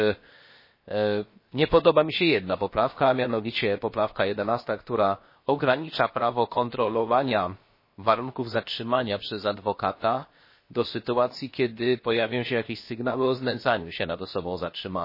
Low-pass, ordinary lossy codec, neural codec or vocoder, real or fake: 5.4 kHz; MP3, 24 kbps; codec, 16 kHz, 0.7 kbps, FocalCodec; fake